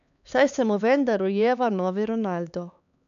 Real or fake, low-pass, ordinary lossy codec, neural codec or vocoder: fake; 7.2 kHz; none; codec, 16 kHz, 4 kbps, X-Codec, HuBERT features, trained on LibriSpeech